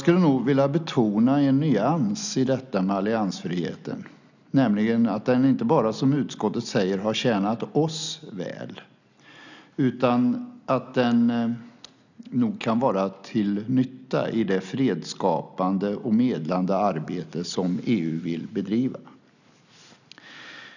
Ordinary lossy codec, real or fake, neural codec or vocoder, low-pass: none; real; none; 7.2 kHz